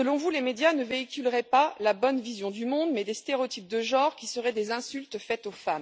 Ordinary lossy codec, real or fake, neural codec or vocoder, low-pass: none; real; none; none